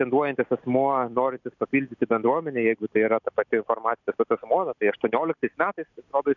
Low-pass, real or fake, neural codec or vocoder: 7.2 kHz; real; none